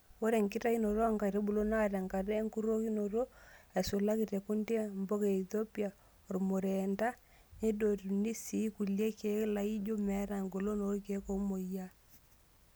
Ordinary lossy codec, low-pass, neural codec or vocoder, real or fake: none; none; none; real